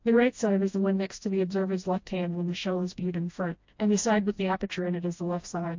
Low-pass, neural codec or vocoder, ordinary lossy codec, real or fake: 7.2 kHz; codec, 16 kHz, 1 kbps, FreqCodec, smaller model; MP3, 48 kbps; fake